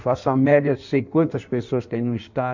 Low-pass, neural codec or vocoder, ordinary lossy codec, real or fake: 7.2 kHz; codec, 16 kHz in and 24 kHz out, 1.1 kbps, FireRedTTS-2 codec; none; fake